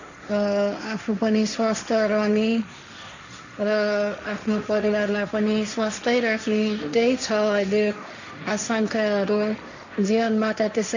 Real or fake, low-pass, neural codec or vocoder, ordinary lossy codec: fake; 7.2 kHz; codec, 16 kHz, 1.1 kbps, Voila-Tokenizer; none